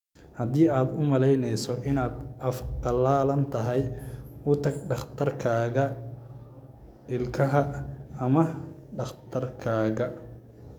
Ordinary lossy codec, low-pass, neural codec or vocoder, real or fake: none; 19.8 kHz; codec, 44.1 kHz, 7.8 kbps, DAC; fake